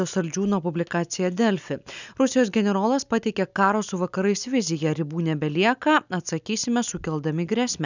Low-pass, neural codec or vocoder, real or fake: 7.2 kHz; none; real